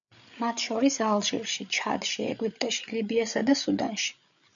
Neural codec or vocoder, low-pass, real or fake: codec, 16 kHz, 8 kbps, FreqCodec, larger model; 7.2 kHz; fake